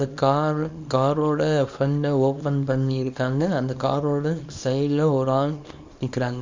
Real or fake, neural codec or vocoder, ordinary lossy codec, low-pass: fake; codec, 24 kHz, 0.9 kbps, WavTokenizer, small release; MP3, 64 kbps; 7.2 kHz